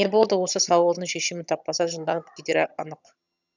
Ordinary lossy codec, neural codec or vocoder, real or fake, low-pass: none; vocoder, 22.05 kHz, 80 mel bands, HiFi-GAN; fake; 7.2 kHz